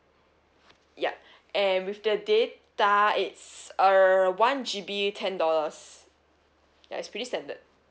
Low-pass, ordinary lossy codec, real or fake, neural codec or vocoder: none; none; real; none